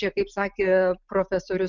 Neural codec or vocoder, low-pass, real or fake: none; 7.2 kHz; real